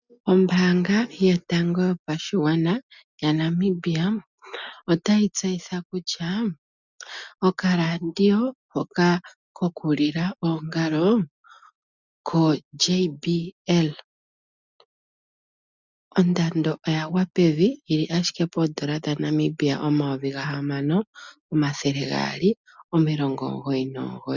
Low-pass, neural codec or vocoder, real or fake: 7.2 kHz; none; real